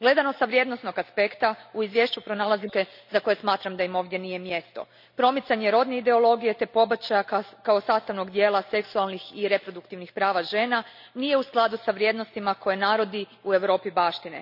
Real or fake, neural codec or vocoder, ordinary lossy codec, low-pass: real; none; none; 5.4 kHz